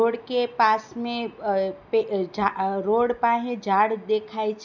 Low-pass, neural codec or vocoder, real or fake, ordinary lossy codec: 7.2 kHz; none; real; none